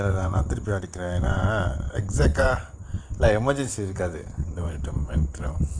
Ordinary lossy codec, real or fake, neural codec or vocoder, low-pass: Opus, 64 kbps; fake; vocoder, 22.05 kHz, 80 mel bands, WaveNeXt; 9.9 kHz